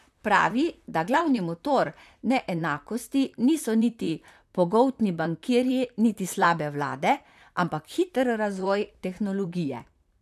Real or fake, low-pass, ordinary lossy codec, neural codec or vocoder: fake; 14.4 kHz; none; vocoder, 44.1 kHz, 128 mel bands, Pupu-Vocoder